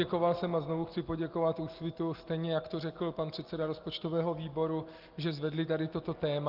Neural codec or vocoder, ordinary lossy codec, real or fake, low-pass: none; Opus, 32 kbps; real; 5.4 kHz